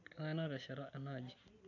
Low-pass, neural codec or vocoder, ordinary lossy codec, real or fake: 7.2 kHz; none; none; real